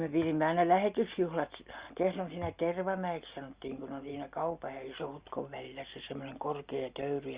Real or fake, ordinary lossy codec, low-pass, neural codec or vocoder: real; Opus, 64 kbps; 3.6 kHz; none